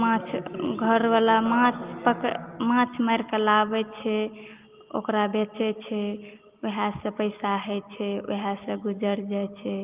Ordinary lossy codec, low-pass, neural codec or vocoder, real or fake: Opus, 32 kbps; 3.6 kHz; none; real